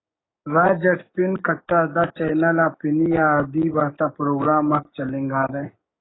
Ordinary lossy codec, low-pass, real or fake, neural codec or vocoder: AAC, 16 kbps; 7.2 kHz; fake; codec, 16 kHz, 6 kbps, DAC